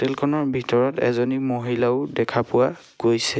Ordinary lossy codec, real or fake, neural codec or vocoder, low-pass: none; real; none; none